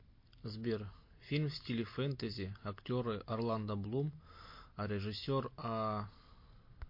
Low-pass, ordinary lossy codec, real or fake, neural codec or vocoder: 5.4 kHz; MP3, 32 kbps; real; none